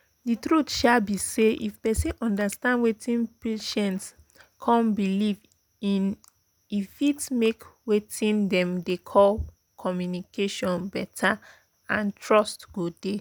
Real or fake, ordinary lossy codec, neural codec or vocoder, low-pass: real; none; none; none